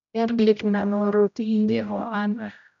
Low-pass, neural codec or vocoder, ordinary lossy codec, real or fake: 7.2 kHz; codec, 16 kHz, 0.5 kbps, X-Codec, HuBERT features, trained on general audio; none; fake